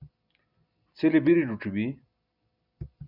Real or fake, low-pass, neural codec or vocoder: real; 5.4 kHz; none